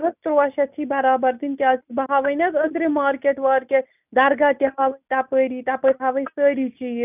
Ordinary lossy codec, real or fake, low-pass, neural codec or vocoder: none; real; 3.6 kHz; none